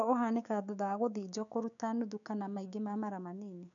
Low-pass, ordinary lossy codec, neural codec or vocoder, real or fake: 7.2 kHz; none; codec, 16 kHz, 6 kbps, DAC; fake